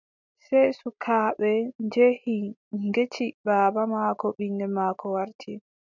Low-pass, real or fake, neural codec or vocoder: 7.2 kHz; real; none